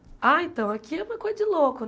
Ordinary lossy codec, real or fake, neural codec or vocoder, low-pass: none; real; none; none